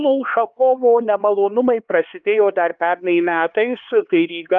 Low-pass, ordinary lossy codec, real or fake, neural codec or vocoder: 7.2 kHz; Opus, 32 kbps; fake; codec, 16 kHz, 4 kbps, X-Codec, HuBERT features, trained on LibriSpeech